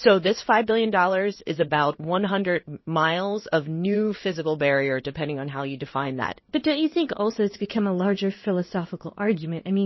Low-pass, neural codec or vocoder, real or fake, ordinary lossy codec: 7.2 kHz; codec, 16 kHz in and 24 kHz out, 1 kbps, XY-Tokenizer; fake; MP3, 24 kbps